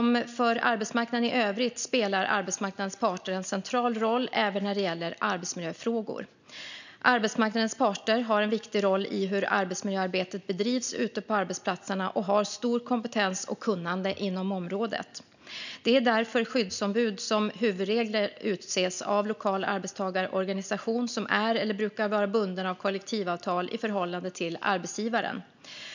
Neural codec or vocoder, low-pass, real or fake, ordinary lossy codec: none; 7.2 kHz; real; none